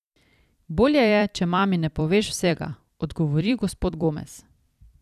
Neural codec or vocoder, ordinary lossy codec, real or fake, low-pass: vocoder, 44.1 kHz, 128 mel bands every 256 samples, BigVGAN v2; none; fake; 14.4 kHz